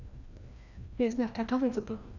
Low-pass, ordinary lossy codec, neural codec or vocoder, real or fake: 7.2 kHz; none; codec, 16 kHz, 1 kbps, FreqCodec, larger model; fake